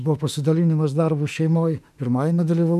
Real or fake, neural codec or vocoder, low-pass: fake; autoencoder, 48 kHz, 32 numbers a frame, DAC-VAE, trained on Japanese speech; 14.4 kHz